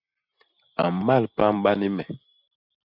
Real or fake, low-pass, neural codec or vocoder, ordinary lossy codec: real; 5.4 kHz; none; AAC, 48 kbps